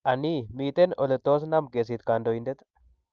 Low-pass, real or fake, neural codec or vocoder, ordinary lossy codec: 7.2 kHz; real; none; Opus, 24 kbps